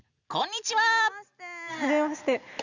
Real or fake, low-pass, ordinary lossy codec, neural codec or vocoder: real; 7.2 kHz; none; none